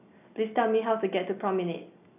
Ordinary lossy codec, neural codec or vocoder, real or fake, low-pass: none; none; real; 3.6 kHz